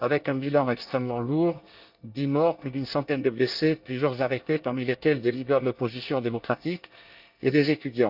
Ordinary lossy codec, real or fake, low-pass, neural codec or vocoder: Opus, 24 kbps; fake; 5.4 kHz; codec, 24 kHz, 1 kbps, SNAC